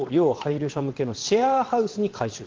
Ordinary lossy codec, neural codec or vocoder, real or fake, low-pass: Opus, 16 kbps; vocoder, 22.05 kHz, 80 mel bands, WaveNeXt; fake; 7.2 kHz